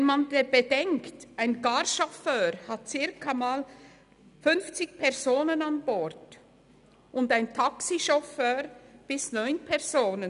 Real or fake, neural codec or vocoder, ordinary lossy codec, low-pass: real; none; none; 10.8 kHz